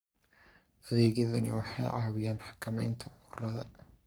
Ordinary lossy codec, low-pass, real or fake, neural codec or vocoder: none; none; fake; codec, 44.1 kHz, 3.4 kbps, Pupu-Codec